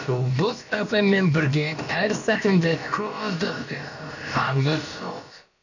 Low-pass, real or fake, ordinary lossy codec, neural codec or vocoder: 7.2 kHz; fake; none; codec, 16 kHz, about 1 kbps, DyCAST, with the encoder's durations